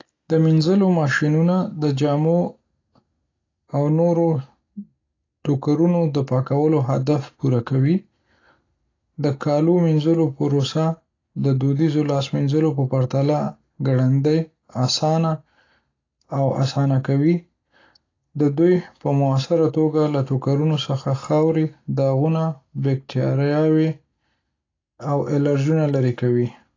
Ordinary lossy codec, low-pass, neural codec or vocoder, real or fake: AAC, 32 kbps; 7.2 kHz; none; real